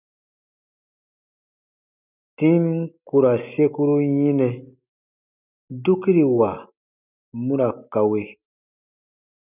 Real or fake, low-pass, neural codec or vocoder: real; 3.6 kHz; none